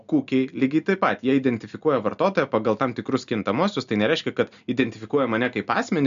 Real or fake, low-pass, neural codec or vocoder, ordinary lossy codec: real; 7.2 kHz; none; AAC, 96 kbps